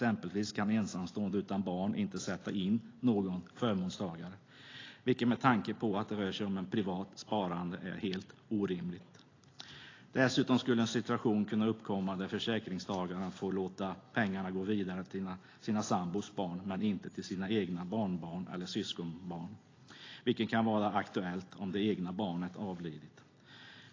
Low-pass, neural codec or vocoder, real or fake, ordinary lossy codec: 7.2 kHz; none; real; AAC, 32 kbps